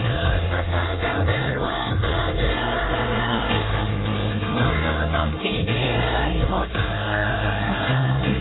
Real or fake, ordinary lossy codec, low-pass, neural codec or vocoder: fake; AAC, 16 kbps; 7.2 kHz; codec, 24 kHz, 1 kbps, SNAC